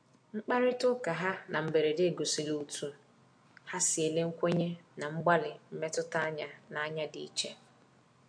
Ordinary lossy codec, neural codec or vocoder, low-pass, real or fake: MP3, 48 kbps; none; 9.9 kHz; real